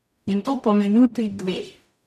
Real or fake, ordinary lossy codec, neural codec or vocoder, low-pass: fake; none; codec, 44.1 kHz, 0.9 kbps, DAC; 14.4 kHz